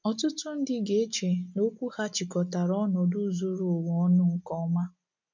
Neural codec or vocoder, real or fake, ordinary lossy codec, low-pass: none; real; none; 7.2 kHz